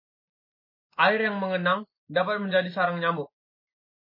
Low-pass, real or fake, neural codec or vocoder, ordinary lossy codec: 5.4 kHz; real; none; MP3, 24 kbps